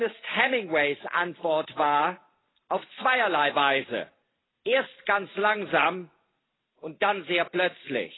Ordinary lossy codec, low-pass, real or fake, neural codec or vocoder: AAC, 16 kbps; 7.2 kHz; real; none